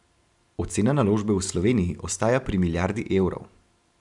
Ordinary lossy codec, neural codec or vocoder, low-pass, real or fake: none; vocoder, 44.1 kHz, 128 mel bands every 512 samples, BigVGAN v2; 10.8 kHz; fake